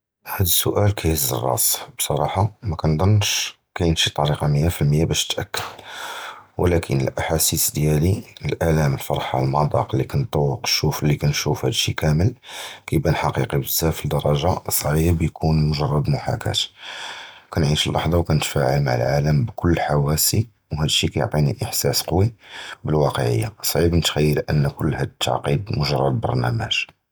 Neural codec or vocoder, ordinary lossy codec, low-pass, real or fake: none; none; none; real